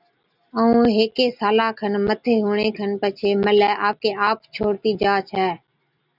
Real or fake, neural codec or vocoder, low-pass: real; none; 5.4 kHz